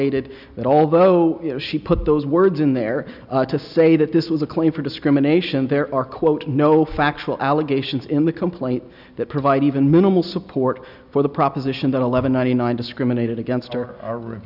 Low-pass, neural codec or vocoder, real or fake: 5.4 kHz; none; real